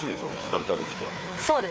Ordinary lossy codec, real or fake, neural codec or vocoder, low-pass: none; fake; codec, 16 kHz, 4 kbps, FreqCodec, larger model; none